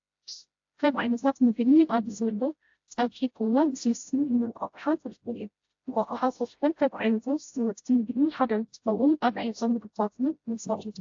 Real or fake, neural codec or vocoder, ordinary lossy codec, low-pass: fake; codec, 16 kHz, 0.5 kbps, FreqCodec, smaller model; AAC, 48 kbps; 7.2 kHz